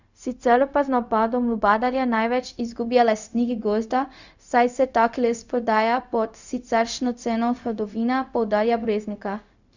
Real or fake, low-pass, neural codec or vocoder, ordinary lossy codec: fake; 7.2 kHz; codec, 16 kHz, 0.4 kbps, LongCat-Audio-Codec; none